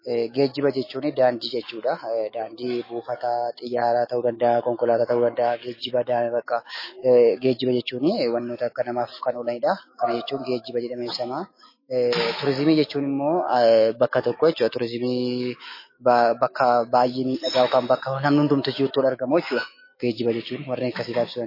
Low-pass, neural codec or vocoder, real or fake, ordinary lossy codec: 5.4 kHz; autoencoder, 48 kHz, 128 numbers a frame, DAC-VAE, trained on Japanese speech; fake; MP3, 24 kbps